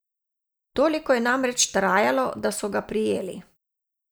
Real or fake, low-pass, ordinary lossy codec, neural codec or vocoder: real; none; none; none